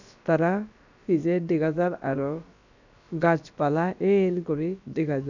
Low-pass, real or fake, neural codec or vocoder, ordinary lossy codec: 7.2 kHz; fake; codec, 16 kHz, about 1 kbps, DyCAST, with the encoder's durations; none